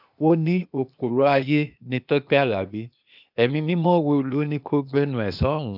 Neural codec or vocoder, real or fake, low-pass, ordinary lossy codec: codec, 16 kHz, 0.8 kbps, ZipCodec; fake; 5.4 kHz; none